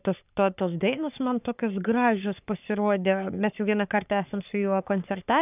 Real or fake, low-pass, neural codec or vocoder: fake; 3.6 kHz; codec, 44.1 kHz, 3.4 kbps, Pupu-Codec